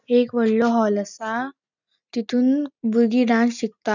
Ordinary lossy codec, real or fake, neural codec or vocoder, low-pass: none; real; none; 7.2 kHz